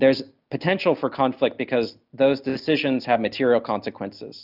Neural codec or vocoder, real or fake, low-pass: none; real; 5.4 kHz